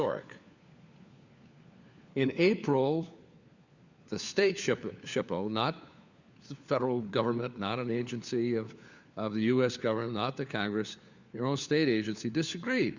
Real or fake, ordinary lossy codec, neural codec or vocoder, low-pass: fake; Opus, 64 kbps; codec, 16 kHz, 4 kbps, FunCodec, trained on LibriTTS, 50 frames a second; 7.2 kHz